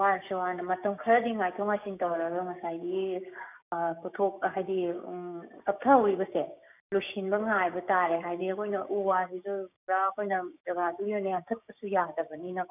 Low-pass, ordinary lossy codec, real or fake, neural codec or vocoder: 3.6 kHz; none; fake; codec, 16 kHz, 6 kbps, DAC